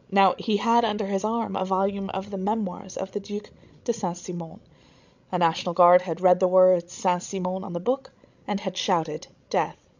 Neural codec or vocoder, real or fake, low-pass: codec, 16 kHz, 16 kbps, FreqCodec, larger model; fake; 7.2 kHz